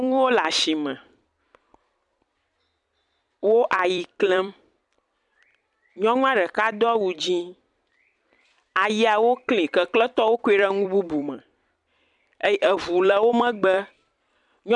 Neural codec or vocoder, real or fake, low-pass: vocoder, 44.1 kHz, 128 mel bands every 256 samples, BigVGAN v2; fake; 10.8 kHz